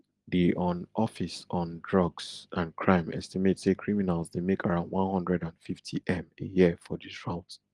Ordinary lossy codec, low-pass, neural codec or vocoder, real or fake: Opus, 24 kbps; 10.8 kHz; none; real